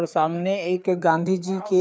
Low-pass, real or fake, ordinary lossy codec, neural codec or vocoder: none; fake; none; codec, 16 kHz, 4 kbps, FreqCodec, larger model